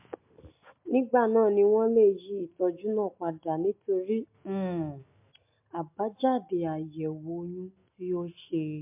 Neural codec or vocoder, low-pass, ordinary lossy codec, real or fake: none; 3.6 kHz; MP3, 32 kbps; real